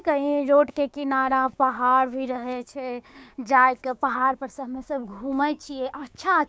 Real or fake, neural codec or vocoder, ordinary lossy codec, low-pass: fake; codec, 16 kHz, 6 kbps, DAC; none; none